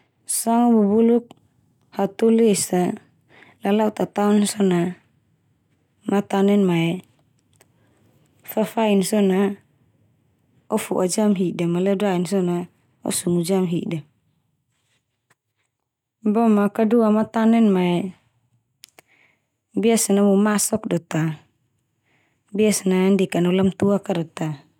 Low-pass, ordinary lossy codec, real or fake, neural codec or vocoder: 19.8 kHz; none; real; none